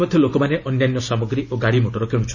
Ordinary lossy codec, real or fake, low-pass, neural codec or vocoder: none; real; 7.2 kHz; none